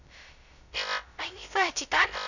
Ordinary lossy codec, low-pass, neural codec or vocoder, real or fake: none; 7.2 kHz; codec, 16 kHz, 0.2 kbps, FocalCodec; fake